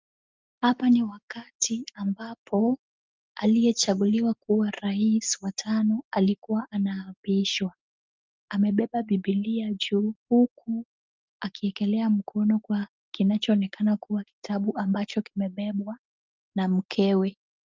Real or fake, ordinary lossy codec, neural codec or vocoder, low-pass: real; Opus, 24 kbps; none; 7.2 kHz